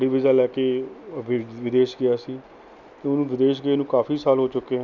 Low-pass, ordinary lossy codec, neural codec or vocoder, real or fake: 7.2 kHz; none; none; real